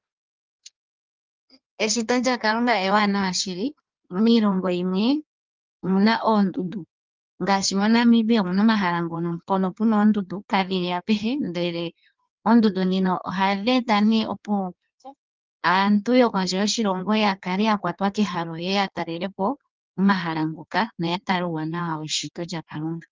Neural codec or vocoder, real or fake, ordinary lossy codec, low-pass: codec, 16 kHz in and 24 kHz out, 1.1 kbps, FireRedTTS-2 codec; fake; Opus, 24 kbps; 7.2 kHz